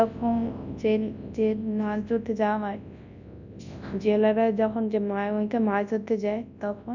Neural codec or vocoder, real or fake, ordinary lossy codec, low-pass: codec, 24 kHz, 0.9 kbps, WavTokenizer, large speech release; fake; none; 7.2 kHz